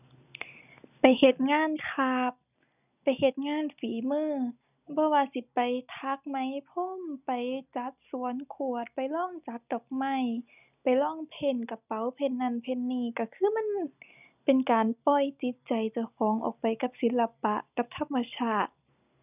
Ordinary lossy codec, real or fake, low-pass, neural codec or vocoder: none; real; 3.6 kHz; none